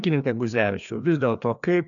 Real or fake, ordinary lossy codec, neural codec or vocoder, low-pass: fake; MP3, 96 kbps; codec, 16 kHz, 2 kbps, FreqCodec, larger model; 7.2 kHz